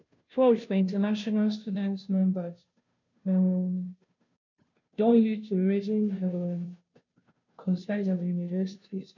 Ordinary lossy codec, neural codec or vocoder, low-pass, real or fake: none; codec, 16 kHz, 0.5 kbps, FunCodec, trained on Chinese and English, 25 frames a second; 7.2 kHz; fake